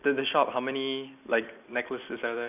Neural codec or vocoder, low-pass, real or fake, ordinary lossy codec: none; 3.6 kHz; real; none